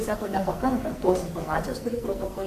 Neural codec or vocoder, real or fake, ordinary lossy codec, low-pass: codec, 44.1 kHz, 2.6 kbps, SNAC; fake; AAC, 96 kbps; 14.4 kHz